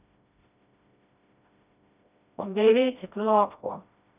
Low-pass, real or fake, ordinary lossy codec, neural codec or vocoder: 3.6 kHz; fake; none; codec, 16 kHz, 1 kbps, FreqCodec, smaller model